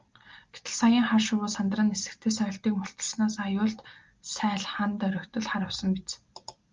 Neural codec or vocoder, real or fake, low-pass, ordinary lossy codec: none; real; 7.2 kHz; Opus, 32 kbps